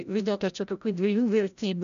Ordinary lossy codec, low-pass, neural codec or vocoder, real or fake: AAC, 96 kbps; 7.2 kHz; codec, 16 kHz, 0.5 kbps, FreqCodec, larger model; fake